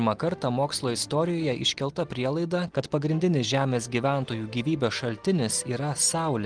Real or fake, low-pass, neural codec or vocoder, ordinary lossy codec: real; 9.9 kHz; none; Opus, 24 kbps